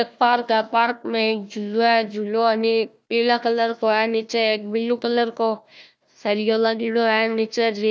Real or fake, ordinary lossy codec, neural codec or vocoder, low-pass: fake; none; codec, 16 kHz, 1 kbps, FunCodec, trained on Chinese and English, 50 frames a second; none